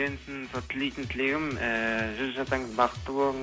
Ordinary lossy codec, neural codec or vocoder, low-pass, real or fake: none; none; none; real